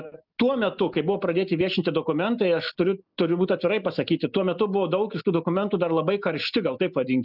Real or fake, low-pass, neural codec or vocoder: real; 5.4 kHz; none